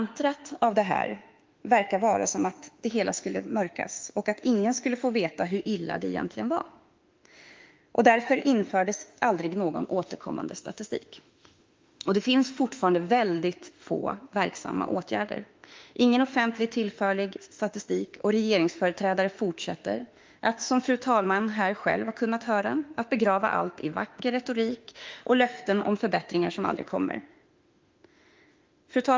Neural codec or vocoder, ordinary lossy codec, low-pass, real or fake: autoencoder, 48 kHz, 32 numbers a frame, DAC-VAE, trained on Japanese speech; Opus, 32 kbps; 7.2 kHz; fake